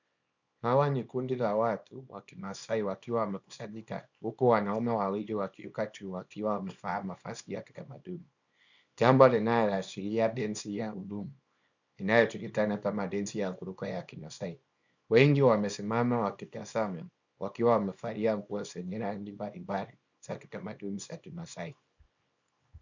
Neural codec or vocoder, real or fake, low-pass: codec, 24 kHz, 0.9 kbps, WavTokenizer, small release; fake; 7.2 kHz